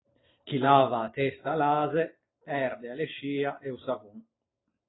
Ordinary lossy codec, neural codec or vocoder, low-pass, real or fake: AAC, 16 kbps; none; 7.2 kHz; real